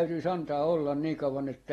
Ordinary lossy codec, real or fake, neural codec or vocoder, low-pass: AAC, 32 kbps; real; none; 19.8 kHz